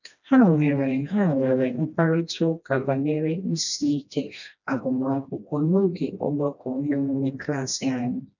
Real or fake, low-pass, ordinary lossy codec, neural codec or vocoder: fake; 7.2 kHz; none; codec, 16 kHz, 1 kbps, FreqCodec, smaller model